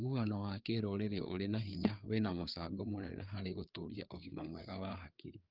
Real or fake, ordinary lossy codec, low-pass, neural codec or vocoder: fake; Opus, 24 kbps; 5.4 kHz; codec, 16 kHz, 4 kbps, FreqCodec, larger model